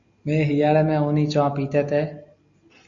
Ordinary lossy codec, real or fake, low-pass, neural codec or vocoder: AAC, 64 kbps; real; 7.2 kHz; none